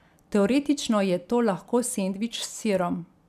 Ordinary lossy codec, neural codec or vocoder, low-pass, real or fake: none; none; 14.4 kHz; real